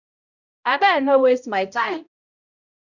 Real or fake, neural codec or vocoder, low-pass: fake; codec, 16 kHz, 0.5 kbps, X-Codec, HuBERT features, trained on balanced general audio; 7.2 kHz